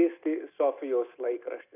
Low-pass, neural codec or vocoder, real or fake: 3.6 kHz; none; real